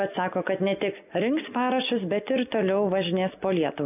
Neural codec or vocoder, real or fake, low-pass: none; real; 3.6 kHz